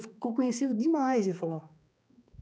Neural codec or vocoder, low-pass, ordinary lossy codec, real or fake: codec, 16 kHz, 2 kbps, X-Codec, HuBERT features, trained on balanced general audio; none; none; fake